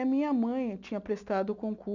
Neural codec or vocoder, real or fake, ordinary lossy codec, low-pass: none; real; none; 7.2 kHz